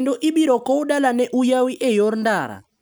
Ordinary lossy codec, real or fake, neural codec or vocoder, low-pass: none; real; none; none